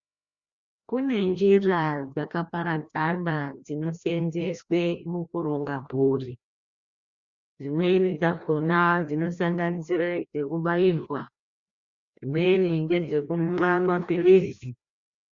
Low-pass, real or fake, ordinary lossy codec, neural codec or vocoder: 7.2 kHz; fake; Opus, 64 kbps; codec, 16 kHz, 1 kbps, FreqCodec, larger model